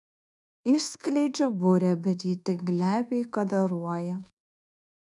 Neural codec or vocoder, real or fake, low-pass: codec, 24 kHz, 1.2 kbps, DualCodec; fake; 10.8 kHz